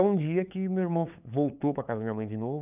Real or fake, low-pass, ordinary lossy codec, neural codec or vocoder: fake; 3.6 kHz; none; codec, 16 kHz, 8 kbps, FunCodec, trained on LibriTTS, 25 frames a second